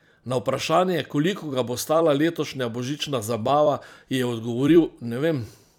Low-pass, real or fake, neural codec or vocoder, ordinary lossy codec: 19.8 kHz; fake; vocoder, 44.1 kHz, 128 mel bands every 256 samples, BigVGAN v2; none